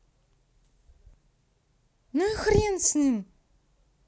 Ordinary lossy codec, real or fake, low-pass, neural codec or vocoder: none; real; none; none